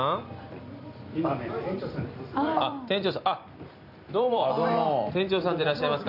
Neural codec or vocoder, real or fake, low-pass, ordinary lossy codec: vocoder, 44.1 kHz, 128 mel bands every 256 samples, BigVGAN v2; fake; 5.4 kHz; none